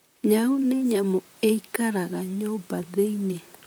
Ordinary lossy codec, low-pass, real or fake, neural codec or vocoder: none; none; fake; vocoder, 44.1 kHz, 128 mel bands, Pupu-Vocoder